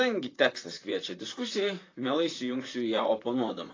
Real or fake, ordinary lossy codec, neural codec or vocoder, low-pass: fake; AAC, 32 kbps; vocoder, 44.1 kHz, 128 mel bands, Pupu-Vocoder; 7.2 kHz